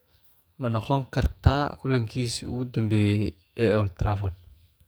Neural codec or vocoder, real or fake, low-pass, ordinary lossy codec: codec, 44.1 kHz, 2.6 kbps, SNAC; fake; none; none